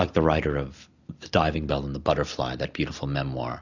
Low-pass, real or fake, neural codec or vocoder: 7.2 kHz; real; none